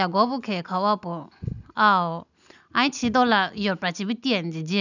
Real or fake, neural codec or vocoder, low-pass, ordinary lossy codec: real; none; 7.2 kHz; none